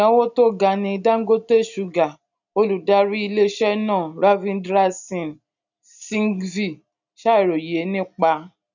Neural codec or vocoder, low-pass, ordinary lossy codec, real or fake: none; 7.2 kHz; none; real